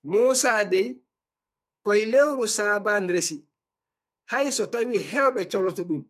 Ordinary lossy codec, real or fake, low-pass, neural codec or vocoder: MP3, 96 kbps; fake; 14.4 kHz; codec, 44.1 kHz, 2.6 kbps, SNAC